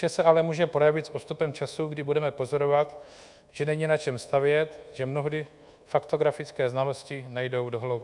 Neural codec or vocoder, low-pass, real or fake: codec, 24 kHz, 1.2 kbps, DualCodec; 10.8 kHz; fake